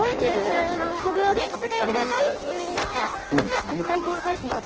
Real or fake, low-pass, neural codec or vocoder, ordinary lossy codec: fake; 7.2 kHz; codec, 16 kHz in and 24 kHz out, 0.6 kbps, FireRedTTS-2 codec; Opus, 16 kbps